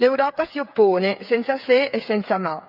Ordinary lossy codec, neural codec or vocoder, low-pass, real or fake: none; codec, 16 kHz, 8 kbps, FreqCodec, smaller model; 5.4 kHz; fake